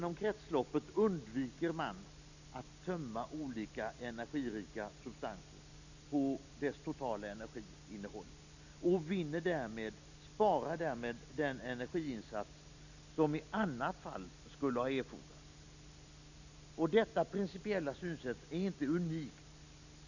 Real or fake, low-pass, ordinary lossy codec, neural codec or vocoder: real; 7.2 kHz; none; none